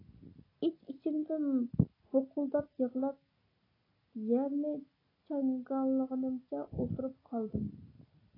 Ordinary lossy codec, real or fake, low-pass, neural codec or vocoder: AAC, 24 kbps; real; 5.4 kHz; none